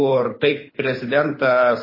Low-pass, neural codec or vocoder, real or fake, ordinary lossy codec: 5.4 kHz; codec, 24 kHz, 6 kbps, HILCodec; fake; MP3, 24 kbps